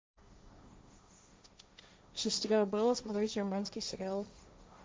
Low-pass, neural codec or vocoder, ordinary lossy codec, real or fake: none; codec, 16 kHz, 1.1 kbps, Voila-Tokenizer; none; fake